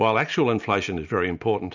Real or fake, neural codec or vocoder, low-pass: real; none; 7.2 kHz